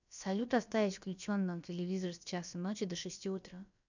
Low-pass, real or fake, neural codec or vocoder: 7.2 kHz; fake; codec, 16 kHz, about 1 kbps, DyCAST, with the encoder's durations